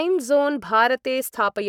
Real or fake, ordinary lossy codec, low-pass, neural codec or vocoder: fake; none; 19.8 kHz; codec, 44.1 kHz, 7.8 kbps, Pupu-Codec